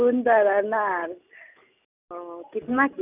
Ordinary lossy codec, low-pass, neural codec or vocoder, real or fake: none; 3.6 kHz; none; real